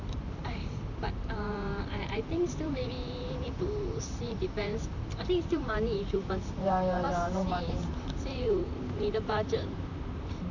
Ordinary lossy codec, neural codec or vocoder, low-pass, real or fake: none; vocoder, 44.1 kHz, 128 mel bands, Pupu-Vocoder; 7.2 kHz; fake